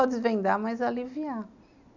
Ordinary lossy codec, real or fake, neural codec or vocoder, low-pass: none; real; none; 7.2 kHz